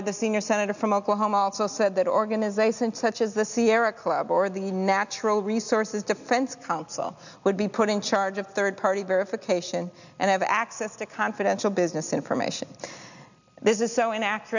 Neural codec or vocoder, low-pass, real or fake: none; 7.2 kHz; real